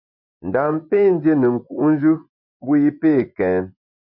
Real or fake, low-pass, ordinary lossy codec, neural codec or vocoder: fake; 5.4 kHz; MP3, 48 kbps; vocoder, 24 kHz, 100 mel bands, Vocos